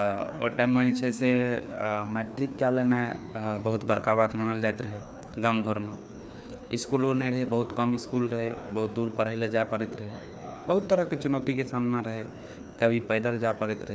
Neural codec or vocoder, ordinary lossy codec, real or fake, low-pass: codec, 16 kHz, 2 kbps, FreqCodec, larger model; none; fake; none